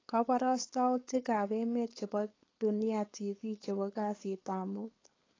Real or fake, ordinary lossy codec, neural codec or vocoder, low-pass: fake; AAC, 32 kbps; codec, 16 kHz, 4.8 kbps, FACodec; 7.2 kHz